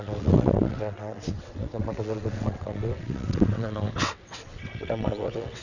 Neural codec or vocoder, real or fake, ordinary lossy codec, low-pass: vocoder, 22.05 kHz, 80 mel bands, WaveNeXt; fake; none; 7.2 kHz